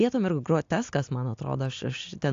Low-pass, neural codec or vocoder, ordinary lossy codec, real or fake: 7.2 kHz; none; AAC, 48 kbps; real